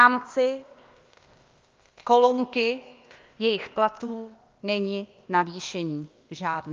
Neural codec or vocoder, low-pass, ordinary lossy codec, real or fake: codec, 16 kHz, 0.8 kbps, ZipCodec; 7.2 kHz; Opus, 24 kbps; fake